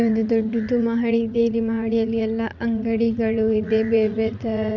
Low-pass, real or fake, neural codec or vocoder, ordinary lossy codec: 7.2 kHz; fake; vocoder, 44.1 kHz, 80 mel bands, Vocos; none